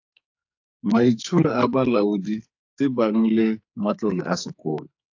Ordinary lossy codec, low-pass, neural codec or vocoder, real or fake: AAC, 48 kbps; 7.2 kHz; codec, 44.1 kHz, 2.6 kbps, SNAC; fake